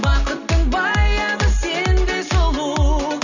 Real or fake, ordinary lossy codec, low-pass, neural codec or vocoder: real; none; 7.2 kHz; none